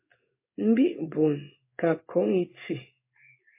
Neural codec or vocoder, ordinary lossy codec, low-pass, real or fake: codec, 16 kHz in and 24 kHz out, 1 kbps, XY-Tokenizer; MP3, 24 kbps; 3.6 kHz; fake